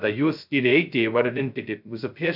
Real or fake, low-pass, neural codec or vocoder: fake; 5.4 kHz; codec, 16 kHz, 0.2 kbps, FocalCodec